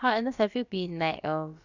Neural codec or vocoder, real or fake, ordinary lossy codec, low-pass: codec, 16 kHz, about 1 kbps, DyCAST, with the encoder's durations; fake; none; 7.2 kHz